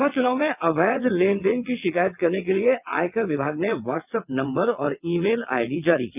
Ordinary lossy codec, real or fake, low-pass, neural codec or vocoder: none; fake; 3.6 kHz; vocoder, 22.05 kHz, 80 mel bands, WaveNeXt